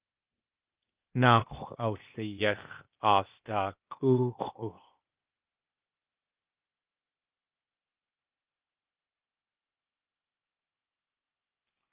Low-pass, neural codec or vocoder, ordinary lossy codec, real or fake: 3.6 kHz; codec, 16 kHz, 0.8 kbps, ZipCodec; Opus, 32 kbps; fake